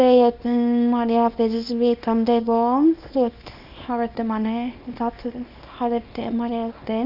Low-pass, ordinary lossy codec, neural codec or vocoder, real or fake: 5.4 kHz; none; codec, 24 kHz, 0.9 kbps, WavTokenizer, small release; fake